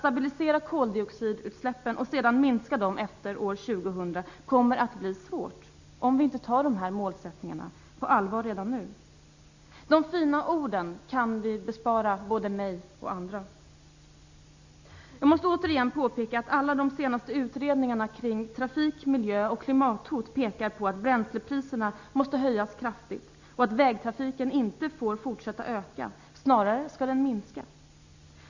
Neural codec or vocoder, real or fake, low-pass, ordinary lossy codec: none; real; 7.2 kHz; Opus, 64 kbps